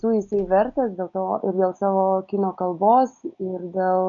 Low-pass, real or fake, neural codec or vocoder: 7.2 kHz; real; none